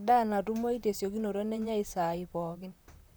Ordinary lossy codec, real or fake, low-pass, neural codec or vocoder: none; fake; none; vocoder, 44.1 kHz, 128 mel bands, Pupu-Vocoder